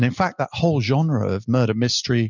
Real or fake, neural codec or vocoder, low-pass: real; none; 7.2 kHz